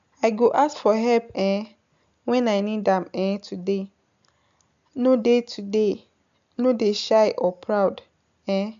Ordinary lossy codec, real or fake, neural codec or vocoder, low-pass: MP3, 64 kbps; real; none; 7.2 kHz